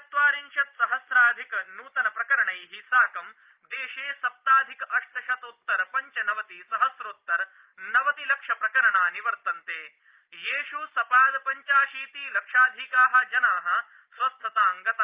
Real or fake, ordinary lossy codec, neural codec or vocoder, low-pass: real; Opus, 32 kbps; none; 3.6 kHz